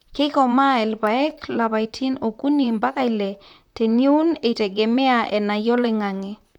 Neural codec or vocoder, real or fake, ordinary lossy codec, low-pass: vocoder, 44.1 kHz, 128 mel bands, Pupu-Vocoder; fake; none; 19.8 kHz